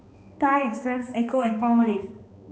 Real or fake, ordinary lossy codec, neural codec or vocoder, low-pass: fake; none; codec, 16 kHz, 2 kbps, X-Codec, HuBERT features, trained on balanced general audio; none